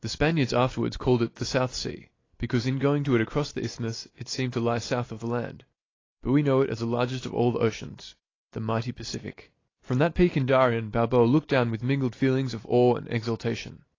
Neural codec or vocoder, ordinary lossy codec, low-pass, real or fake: none; AAC, 32 kbps; 7.2 kHz; real